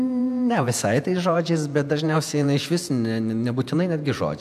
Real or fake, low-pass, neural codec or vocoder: fake; 14.4 kHz; vocoder, 48 kHz, 128 mel bands, Vocos